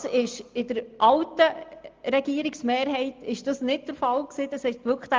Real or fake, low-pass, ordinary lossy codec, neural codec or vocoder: real; 7.2 kHz; Opus, 24 kbps; none